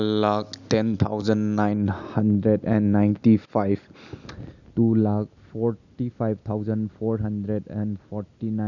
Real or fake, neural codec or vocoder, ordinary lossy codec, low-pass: real; none; none; 7.2 kHz